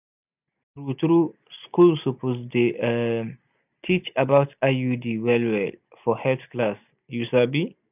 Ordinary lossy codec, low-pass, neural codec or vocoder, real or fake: none; 3.6 kHz; none; real